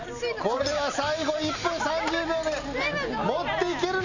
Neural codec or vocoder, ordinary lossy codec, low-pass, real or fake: none; none; 7.2 kHz; real